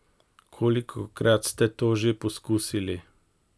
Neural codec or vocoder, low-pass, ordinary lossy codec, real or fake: none; none; none; real